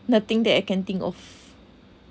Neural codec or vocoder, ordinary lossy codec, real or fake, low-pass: none; none; real; none